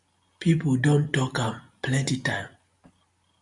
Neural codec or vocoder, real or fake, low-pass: none; real; 10.8 kHz